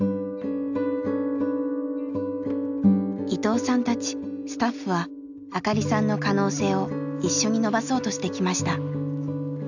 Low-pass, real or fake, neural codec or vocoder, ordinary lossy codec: 7.2 kHz; real; none; none